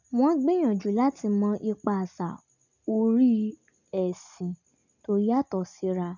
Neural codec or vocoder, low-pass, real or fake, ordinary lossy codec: none; 7.2 kHz; real; none